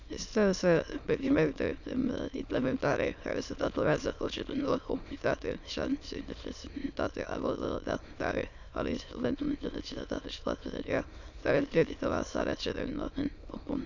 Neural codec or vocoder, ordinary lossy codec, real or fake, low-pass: autoencoder, 22.05 kHz, a latent of 192 numbers a frame, VITS, trained on many speakers; none; fake; 7.2 kHz